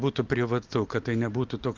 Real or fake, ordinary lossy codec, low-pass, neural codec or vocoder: real; Opus, 24 kbps; 7.2 kHz; none